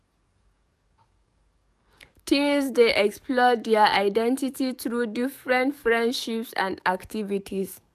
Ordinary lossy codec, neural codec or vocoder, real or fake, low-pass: none; codec, 44.1 kHz, 7.8 kbps, DAC; fake; 14.4 kHz